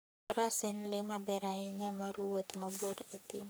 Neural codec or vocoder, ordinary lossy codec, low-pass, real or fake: codec, 44.1 kHz, 3.4 kbps, Pupu-Codec; none; none; fake